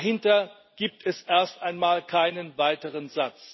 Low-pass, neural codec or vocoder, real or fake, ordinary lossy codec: 7.2 kHz; none; real; MP3, 24 kbps